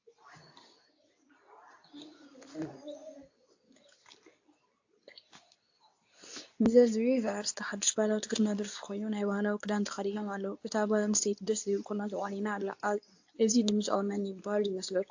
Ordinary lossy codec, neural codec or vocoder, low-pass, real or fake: AAC, 48 kbps; codec, 24 kHz, 0.9 kbps, WavTokenizer, medium speech release version 2; 7.2 kHz; fake